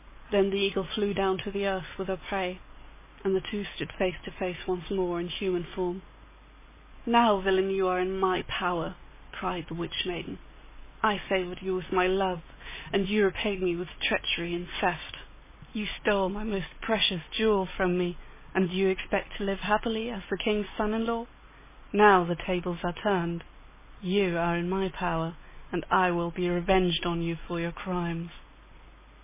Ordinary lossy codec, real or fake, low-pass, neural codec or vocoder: MP3, 16 kbps; real; 3.6 kHz; none